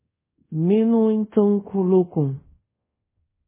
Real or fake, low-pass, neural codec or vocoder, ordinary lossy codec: fake; 3.6 kHz; codec, 24 kHz, 0.5 kbps, DualCodec; MP3, 16 kbps